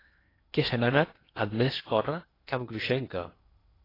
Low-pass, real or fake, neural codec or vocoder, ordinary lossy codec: 5.4 kHz; fake; codec, 16 kHz in and 24 kHz out, 0.8 kbps, FocalCodec, streaming, 65536 codes; AAC, 24 kbps